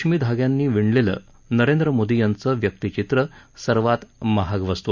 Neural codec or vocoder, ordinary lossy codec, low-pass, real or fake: none; none; 7.2 kHz; real